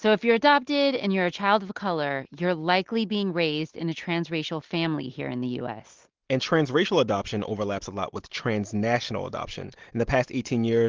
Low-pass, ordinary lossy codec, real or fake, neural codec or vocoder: 7.2 kHz; Opus, 16 kbps; real; none